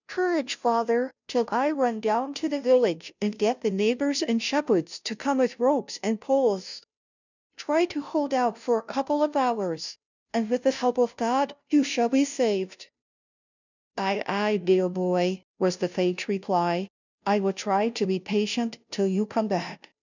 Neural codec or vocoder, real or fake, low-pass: codec, 16 kHz, 0.5 kbps, FunCodec, trained on Chinese and English, 25 frames a second; fake; 7.2 kHz